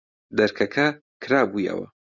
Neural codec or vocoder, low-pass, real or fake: vocoder, 44.1 kHz, 128 mel bands every 256 samples, BigVGAN v2; 7.2 kHz; fake